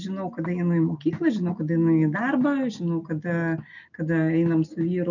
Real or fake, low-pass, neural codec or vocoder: real; 7.2 kHz; none